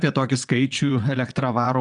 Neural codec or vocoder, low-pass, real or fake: vocoder, 22.05 kHz, 80 mel bands, WaveNeXt; 9.9 kHz; fake